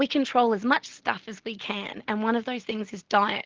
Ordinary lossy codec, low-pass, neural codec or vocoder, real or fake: Opus, 16 kbps; 7.2 kHz; none; real